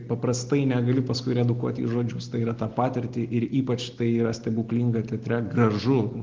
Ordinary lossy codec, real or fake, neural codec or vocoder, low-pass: Opus, 16 kbps; real; none; 7.2 kHz